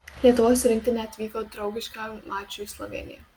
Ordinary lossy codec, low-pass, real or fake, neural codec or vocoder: Opus, 32 kbps; 19.8 kHz; real; none